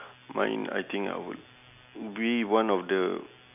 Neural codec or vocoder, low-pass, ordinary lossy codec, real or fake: none; 3.6 kHz; AAC, 32 kbps; real